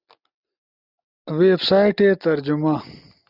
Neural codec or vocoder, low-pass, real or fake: none; 5.4 kHz; real